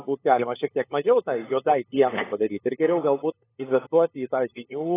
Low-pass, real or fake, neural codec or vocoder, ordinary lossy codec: 3.6 kHz; fake; codec, 16 kHz, 8 kbps, FunCodec, trained on LibriTTS, 25 frames a second; AAC, 16 kbps